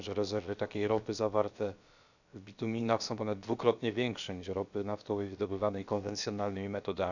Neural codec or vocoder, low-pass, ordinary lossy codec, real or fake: codec, 16 kHz, about 1 kbps, DyCAST, with the encoder's durations; 7.2 kHz; none; fake